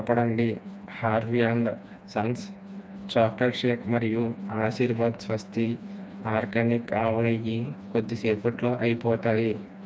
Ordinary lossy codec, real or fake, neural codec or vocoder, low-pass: none; fake; codec, 16 kHz, 2 kbps, FreqCodec, smaller model; none